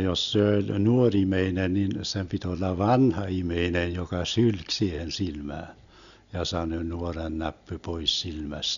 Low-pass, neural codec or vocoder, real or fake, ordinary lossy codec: 7.2 kHz; none; real; none